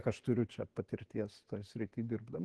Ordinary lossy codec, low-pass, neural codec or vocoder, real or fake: Opus, 16 kbps; 10.8 kHz; none; real